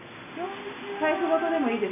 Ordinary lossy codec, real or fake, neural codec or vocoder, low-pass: none; real; none; 3.6 kHz